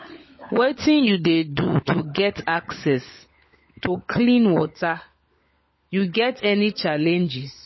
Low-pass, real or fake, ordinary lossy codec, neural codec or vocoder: 7.2 kHz; fake; MP3, 24 kbps; codec, 16 kHz, 16 kbps, FunCodec, trained on LibriTTS, 50 frames a second